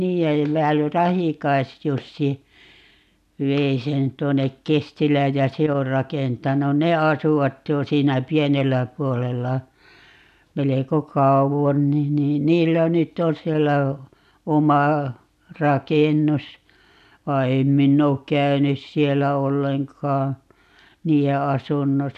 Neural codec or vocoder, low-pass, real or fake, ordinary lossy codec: none; 14.4 kHz; real; none